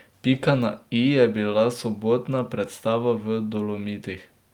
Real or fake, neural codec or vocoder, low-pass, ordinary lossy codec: real; none; 19.8 kHz; Opus, 32 kbps